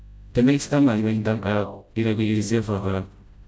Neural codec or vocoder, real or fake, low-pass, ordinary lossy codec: codec, 16 kHz, 0.5 kbps, FreqCodec, smaller model; fake; none; none